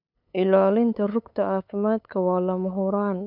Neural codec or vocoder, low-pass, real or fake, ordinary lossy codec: codec, 16 kHz, 8 kbps, FunCodec, trained on LibriTTS, 25 frames a second; 5.4 kHz; fake; none